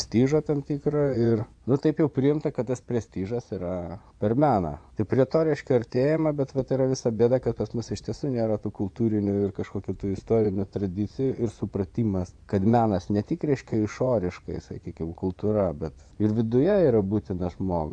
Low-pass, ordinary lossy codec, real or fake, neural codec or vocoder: 9.9 kHz; AAC, 64 kbps; fake; vocoder, 24 kHz, 100 mel bands, Vocos